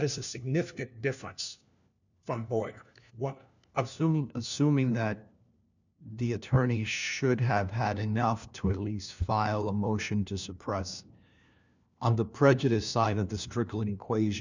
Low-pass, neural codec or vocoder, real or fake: 7.2 kHz; codec, 16 kHz, 1 kbps, FunCodec, trained on LibriTTS, 50 frames a second; fake